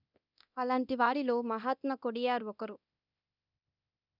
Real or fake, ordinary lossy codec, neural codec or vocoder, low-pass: fake; none; codec, 24 kHz, 0.9 kbps, DualCodec; 5.4 kHz